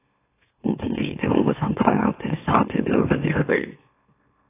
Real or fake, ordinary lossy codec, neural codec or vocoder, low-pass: fake; AAC, 24 kbps; autoencoder, 44.1 kHz, a latent of 192 numbers a frame, MeloTTS; 3.6 kHz